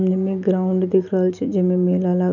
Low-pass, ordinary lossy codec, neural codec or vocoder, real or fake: 7.2 kHz; none; none; real